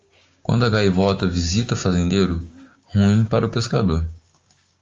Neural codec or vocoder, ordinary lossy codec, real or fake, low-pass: codec, 16 kHz, 6 kbps, DAC; Opus, 32 kbps; fake; 7.2 kHz